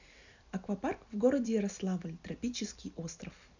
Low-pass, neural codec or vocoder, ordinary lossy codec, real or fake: 7.2 kHz; none; none; real